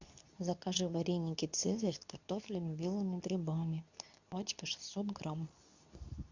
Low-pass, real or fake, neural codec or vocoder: 7.2 kHz; fake; codec, 24 kHz, 0.9 kbps, WavTokenizer, medium speech release version 2